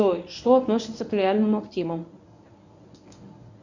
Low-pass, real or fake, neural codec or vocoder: 7.2 kHz; fake; codec, 24 kHz, 0.9 kbps, WavTokenizer, medium speech release version 1